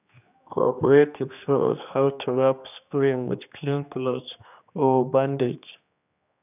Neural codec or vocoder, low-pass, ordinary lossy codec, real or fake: codec, 16 kHz, 2 kbps, X-Codec, HuBERT features, trained on general audio; 3.6 kHz; none; fake